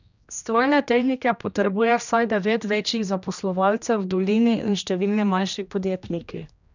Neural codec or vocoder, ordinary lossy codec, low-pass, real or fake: codec, 16 kHz, 1 kbps, X-Codec, HuBERT features, trained on general audio; none; 7.2 kHz; fake